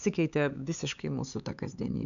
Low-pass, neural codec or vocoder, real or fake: 7.2 kHz; codec, 16 kHz, 4 kbps, X-Codec, HuBERT features, trained on LibriSpeech; fake